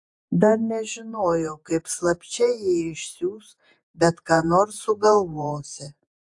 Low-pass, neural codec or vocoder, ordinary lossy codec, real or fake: 10.8 kHz; vocoder, 48 kHz, 128 mel bands, Vocos; AAC, 64 kbps; fake